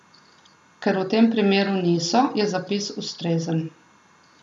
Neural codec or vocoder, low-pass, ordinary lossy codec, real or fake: none; none; none; real